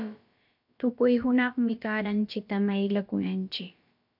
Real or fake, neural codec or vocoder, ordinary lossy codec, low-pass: fake; codec, 16 kHz, about 1 kbps, DyCAST, with the encoder's durations; MP3, 48 kbps; 5.4 kHz